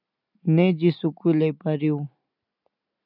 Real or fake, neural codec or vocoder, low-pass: real; none; 5.4 kHz